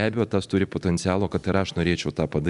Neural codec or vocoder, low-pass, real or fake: none; 10.8 kHz; real